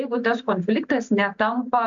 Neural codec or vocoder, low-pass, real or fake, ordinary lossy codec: none; 7.2 kHz; real; AAC, 64 kbps